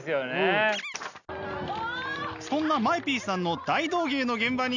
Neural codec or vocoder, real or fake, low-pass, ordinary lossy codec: none; real; 7.2 kHz; none